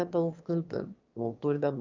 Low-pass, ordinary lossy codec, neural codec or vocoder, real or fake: 7.2 kHz; Opus, 24 kbps; autoencoder, 22.05 kHz, a latent of 192 numbers a frame, VITS, trained on one speaker; fake